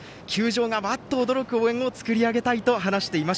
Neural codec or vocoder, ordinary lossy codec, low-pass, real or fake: none; none; none; real